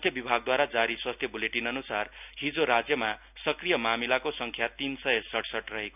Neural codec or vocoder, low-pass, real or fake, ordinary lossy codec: none; 3.6 kHz; real; none